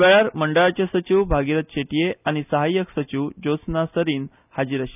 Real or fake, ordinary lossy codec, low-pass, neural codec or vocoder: real; none; 3.6 kHz; none